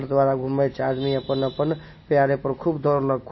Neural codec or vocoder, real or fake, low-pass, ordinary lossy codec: none; real; 7.2 kHz; MP3, 24 kbps